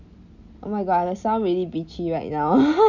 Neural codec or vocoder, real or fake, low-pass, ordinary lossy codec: none; real; 7.2 kHz; none